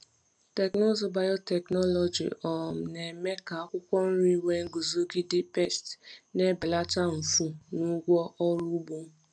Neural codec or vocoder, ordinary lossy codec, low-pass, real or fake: none; none; 9.9 kHz; real